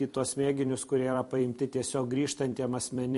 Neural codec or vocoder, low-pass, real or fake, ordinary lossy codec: vocoder, 44.1 kHz, 128 mel bands every 256 samples, BigVGAN v2; 14.4 kHz; fake; MP3, 48 kbps